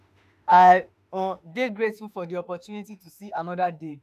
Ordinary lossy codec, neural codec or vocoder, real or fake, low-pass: none; autoencoder, 48 kHz, 32 numbers a frame, DAC-VAE, trained on Japanese speech; fake; 14.4 kHz